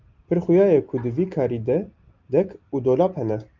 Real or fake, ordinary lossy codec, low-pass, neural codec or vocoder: real; Opus, 32 kbps; 7.2 kHz; none